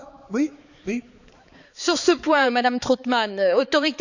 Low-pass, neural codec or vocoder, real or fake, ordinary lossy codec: 7.2 kHz; codec, 16 kHz, 4 kbps, X-Codec, HuBERT features, trained on balanced general audio; fake; none